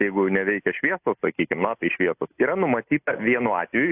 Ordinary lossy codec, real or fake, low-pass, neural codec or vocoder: AAC, 32 kbps; real; 3.6 kHz; none